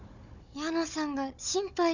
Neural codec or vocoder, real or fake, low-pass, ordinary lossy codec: codec, 16 kHz, 16 kbps, FunCodec, trained on Chinese and English, 50 frames a second; fake; 7.2 kHz; none